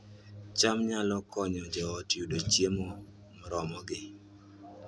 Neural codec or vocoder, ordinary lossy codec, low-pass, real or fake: none; none; none; real